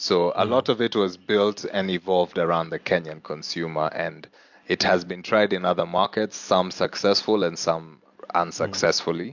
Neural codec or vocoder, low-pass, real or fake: none; 7.2 kHz; real